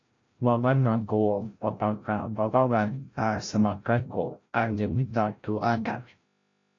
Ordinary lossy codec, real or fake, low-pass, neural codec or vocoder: AAC, 48 kbps; fake; 7.2 kHz; codec, 16 kHz, 0.5 kbps, FreqCodec, larger model